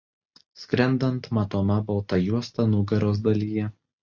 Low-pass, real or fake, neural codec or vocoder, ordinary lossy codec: 7.2 kHz; real; none; Opus, 64 kbps